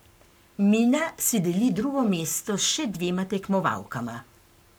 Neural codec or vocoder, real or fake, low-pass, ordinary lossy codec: codec, 44.1 kHz, 7.8 kbps, Pupu-Codec; fake; none; none